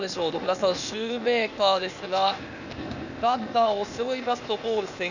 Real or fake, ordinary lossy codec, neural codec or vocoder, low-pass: fake; none; codec, 16 kHz, 0.8 kbps, ZipCodec; 7.2 kHz